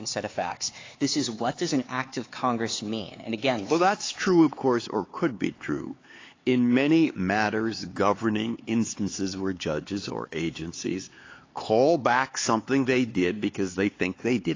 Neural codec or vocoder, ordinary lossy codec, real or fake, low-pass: codec, 16 kHz, 4 kbps, X-Codec, HuBERT features, trained on LibriSpeech; AAC, 32 kbps; fake; 7.2 kHz